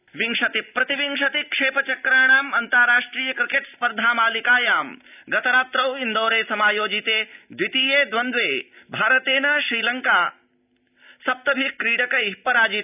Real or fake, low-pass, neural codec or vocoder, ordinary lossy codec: real; 3.6 kHz; none; none